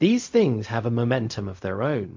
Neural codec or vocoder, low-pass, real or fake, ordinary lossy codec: codec, 16 kHz, 0.4 kbps, LongCat-Audio-Codec; 7.2 kHz; fake; MP3, 48 kbps